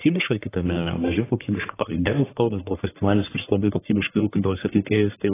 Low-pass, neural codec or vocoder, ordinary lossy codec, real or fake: 3.6 kHz; codec, 44.1 kHz, 1.7 kbps, Pupu-Codec; AAC, 16 kbps; fake